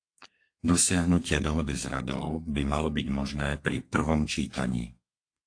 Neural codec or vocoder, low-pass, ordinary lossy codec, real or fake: codec, 32 kHz, 1.9 kbps, SNAC; 9.9 kHz; AAC, 48 kbps; fake